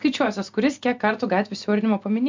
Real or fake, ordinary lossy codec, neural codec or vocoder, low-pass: real; MP3, 64 kbps; none; 7.2 kHz